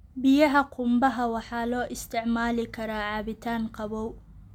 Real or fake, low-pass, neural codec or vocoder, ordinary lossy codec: real; 19.8 kHz; none; none